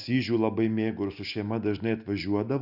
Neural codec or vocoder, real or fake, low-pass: none; real; 5.4 kHz